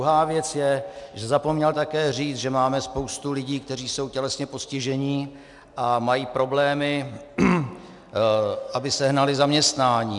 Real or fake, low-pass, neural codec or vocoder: real; 10.8 kHz; none